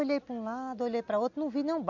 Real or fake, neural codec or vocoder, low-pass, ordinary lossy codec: real; none; 7.2 kHz; MP3, 64 kbps